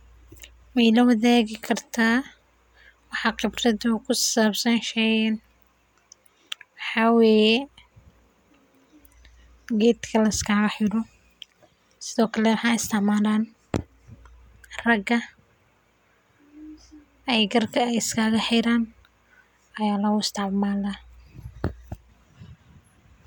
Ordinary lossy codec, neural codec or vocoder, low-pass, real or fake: MP3, 96 kbps; none; 19.8 kHz; real